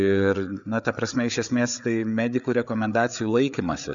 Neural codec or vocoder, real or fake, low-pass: codec, 16 kHz, 8 kbps, FreqCodec, larger model; fake; 7.2 kHz